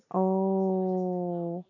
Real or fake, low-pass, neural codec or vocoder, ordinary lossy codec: real; 7.2 kHz; none; none